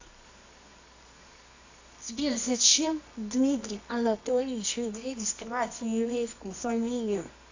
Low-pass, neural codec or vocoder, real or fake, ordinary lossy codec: 7.2 kHz; codec, 24 kHz, 0.9 kbps, WavTokenizer, medium music audio release; fake; AAC, 48 kbps